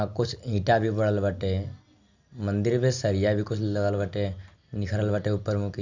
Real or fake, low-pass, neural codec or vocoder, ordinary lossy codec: real; 7.2 kHz; none; Opus, 64 kbps